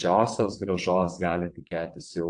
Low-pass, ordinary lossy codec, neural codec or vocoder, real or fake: 9.9 kHz; AAC, 64 kbps; vocoder, 22.05 kHz, 80 mel bands, WaveNeXt; fake